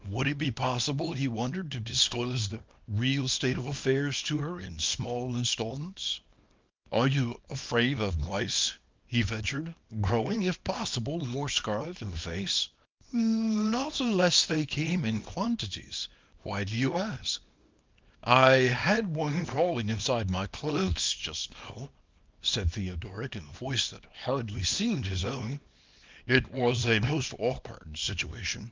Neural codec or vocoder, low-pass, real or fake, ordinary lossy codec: codec, 24 kHz, 0.9 kbps, WavTokenizer, small release; 7.2 kHz; fake; Opus, 24 kbps